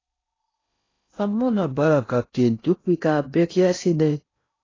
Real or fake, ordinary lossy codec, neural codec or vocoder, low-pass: fake; AAC, 32 kbps; codec, 16 kHz in and 24 kHz out, 0.6 kbps, FocalCodec, streaming, 4096 codes; 7.2 kHz